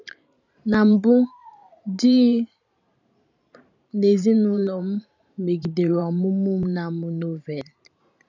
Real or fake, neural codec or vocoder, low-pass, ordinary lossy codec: fake; vocoder, 44.1 kHz, 128 mel bands every 512 samples, BigVGAN v2; 7.2 kHz; none